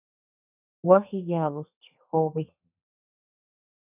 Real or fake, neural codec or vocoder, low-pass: fake; codec, 32 kHz, 1.9 kbps, SNAC; 3.6 kHz